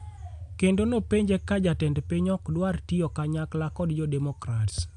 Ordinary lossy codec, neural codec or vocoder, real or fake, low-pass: AAC, 64 kbps; none; real; 10.8 kHz